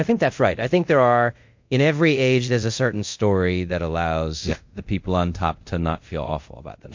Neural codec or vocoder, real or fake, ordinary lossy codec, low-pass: codec, 24 kHz, 0.5 kbps, DualCodec; fake; MP3, 48 kbps; 7.2 kHz